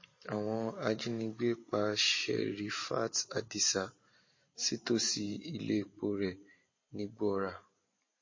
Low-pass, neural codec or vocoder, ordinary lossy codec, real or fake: 7.2 kHz; vocoder, 44.1 kHz, 128 mel bands every 512 samples, BigVGAN v2; MP3, 32 kbps; fake